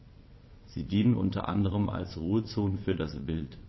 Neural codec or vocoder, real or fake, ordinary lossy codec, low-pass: vocoder, 22.05 kHz, 80 mel bands, WaveNeXt; fake; MP3, 24 kbps; 7.2 kHz